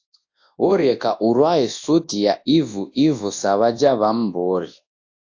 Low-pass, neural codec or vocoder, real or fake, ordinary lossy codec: 7.2 kHz; codec, 24 kHz, 0.9 kbps, WavTokenizer, large speech release; fake; AAC, 48 kbps